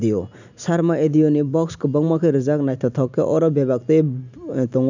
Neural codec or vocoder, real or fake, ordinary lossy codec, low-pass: none; real; none; 7.2 kHz